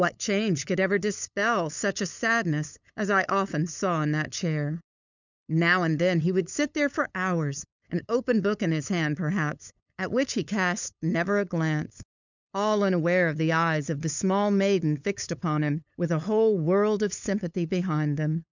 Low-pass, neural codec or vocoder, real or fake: 7.2 kHz; codec, 16 kHz, 8 kbps, FunCodec, trained on LibriTTS, 25 frames a second; fake